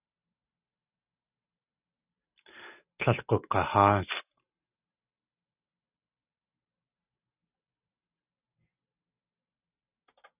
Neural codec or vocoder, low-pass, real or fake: none; 3.6 kHz; real